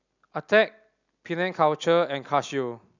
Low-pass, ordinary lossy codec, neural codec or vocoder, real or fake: 7.2 kHz; none; none; real